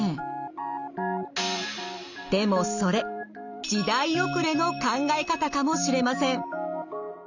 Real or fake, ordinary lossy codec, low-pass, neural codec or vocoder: real; none; 7.2 kHz; none